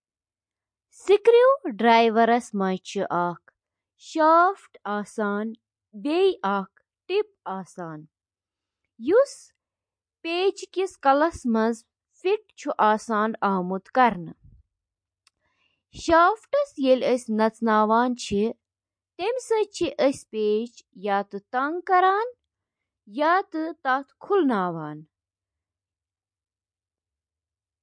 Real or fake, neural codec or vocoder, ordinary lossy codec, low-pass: real; none; MP3, 48 kbps; 9.9 kHz